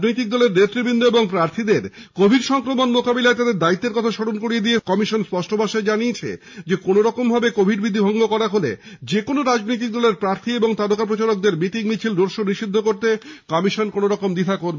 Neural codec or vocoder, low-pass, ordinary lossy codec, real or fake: none; 7.2 kHz; MP3, 64 kbps; real